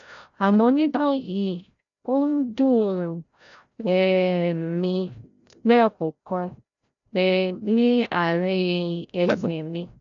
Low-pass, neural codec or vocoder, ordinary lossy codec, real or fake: 7.2 kHz; codec, 16 kHz, 0.5 kbps, FreqCodec, larger model; none; fake